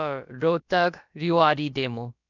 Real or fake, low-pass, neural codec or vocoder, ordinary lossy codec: fake; 7.2 kHz; codec, 16 kHz, about 1 kbps, DyCAST, with the encoder's durations; none